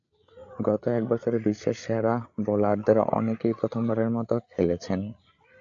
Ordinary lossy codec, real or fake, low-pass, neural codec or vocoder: AAC, 64 kbps; fake; 7.2 kHz; codec, 16 kHz, 8 kbps, FreqCodec, larger model